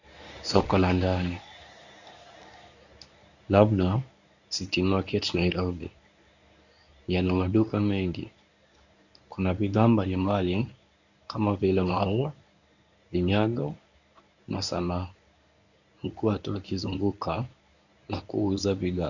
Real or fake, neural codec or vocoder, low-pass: fake; codec, 24 kHz, 0.9 kbps, WavTokenizer, medium speech release version 1; 7.2 kHz